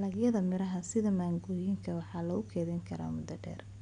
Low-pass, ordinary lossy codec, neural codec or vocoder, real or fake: 9.9 kHz; none; none; real